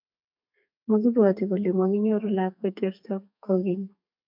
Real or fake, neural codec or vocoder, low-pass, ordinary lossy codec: fake; codec, 32 kHz, 1.9 kbps, SNAC; 5.4 kHz; MP3, 48 kbps